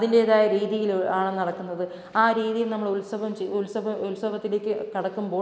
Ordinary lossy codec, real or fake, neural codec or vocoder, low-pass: none; real; none; none